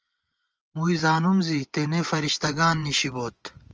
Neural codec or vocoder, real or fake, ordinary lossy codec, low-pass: vocoder, 24 kHz, 100 mel bands, Vocos; fake; Opus, 24 kbps; 7.2 kHz